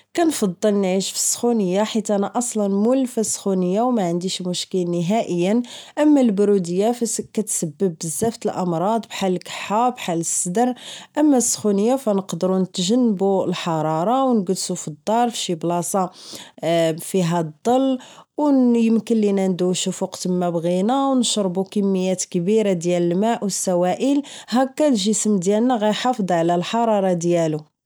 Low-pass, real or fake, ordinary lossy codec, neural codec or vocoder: none; real; none; none